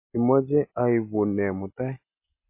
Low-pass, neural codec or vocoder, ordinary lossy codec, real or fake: 3.6 kHz; none; MP3, 24 kbps; real